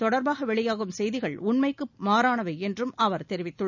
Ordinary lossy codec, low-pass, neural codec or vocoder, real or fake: none; 7.2 kHz; none; real